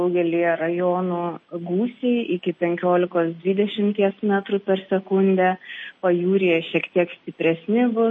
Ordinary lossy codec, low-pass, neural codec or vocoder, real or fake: MP3, 32 kbps; 9.9 kHz; none; real